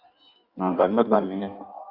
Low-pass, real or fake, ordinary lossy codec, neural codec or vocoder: 5.4 kHz; fake; Opus, 64 kbps; codec, 16 kHz in and 24 kHz out, 1.1 kbps, FireRedTTS-2 codec